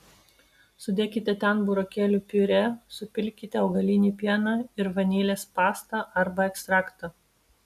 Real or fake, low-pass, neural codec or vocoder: real; 14.4 kHz; none